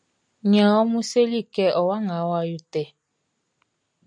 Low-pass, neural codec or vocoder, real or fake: 9.9 kHz; none; real